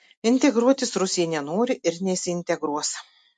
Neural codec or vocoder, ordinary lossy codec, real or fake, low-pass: none; MP3, 48 kbps; real; 10.8 kHz